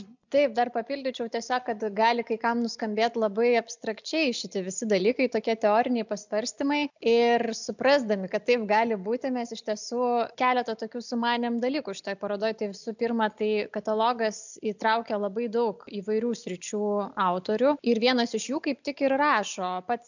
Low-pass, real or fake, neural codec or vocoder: 7.2 kHz; real; none